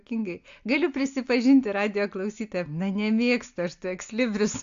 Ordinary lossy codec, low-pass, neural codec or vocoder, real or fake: AAC, 64 kbps; 7.2 kHz; none; real